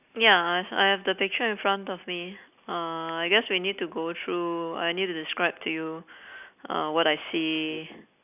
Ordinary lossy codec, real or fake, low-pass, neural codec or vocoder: none; real; 3.6 kHz; none